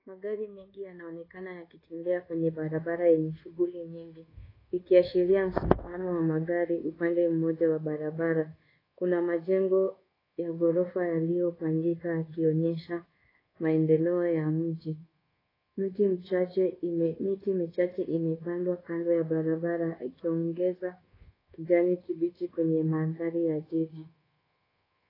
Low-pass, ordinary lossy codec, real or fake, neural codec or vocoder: 5.4 kHz; AAC, 24 kbps; fake; codec, 24 kHz, 1.2 kbps, DualCodec